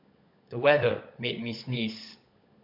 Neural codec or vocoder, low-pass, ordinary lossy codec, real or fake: codec, 16 kHz, 16 kbps, FunCodec, trained on LibriTTS, 50 frames a second; 5.4 kHz; MP3, 48 kbps; fake